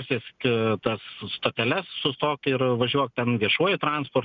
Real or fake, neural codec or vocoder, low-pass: real; none; 7.2 kHz